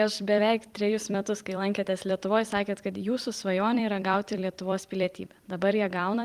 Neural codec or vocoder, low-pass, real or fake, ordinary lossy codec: vocoder, 44.1 kHz, 128 mel bands every 256 samples, BigVGAN v2; 14.4 kHz; fake; Opus, 24 kbps